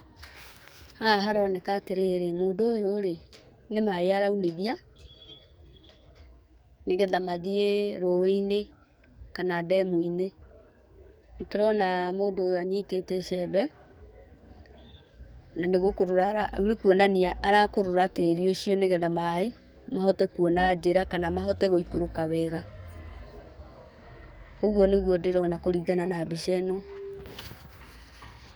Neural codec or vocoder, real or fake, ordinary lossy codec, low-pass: codec, 44.1 kHz, 2.6 kbps, SNAC; fake; none; none